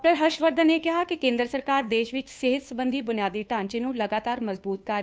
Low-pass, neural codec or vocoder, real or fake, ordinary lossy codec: none; codec, 16 kHz, 2 kbps, FunCodec, trained on Chinese and English, 25 frames a second; fake; none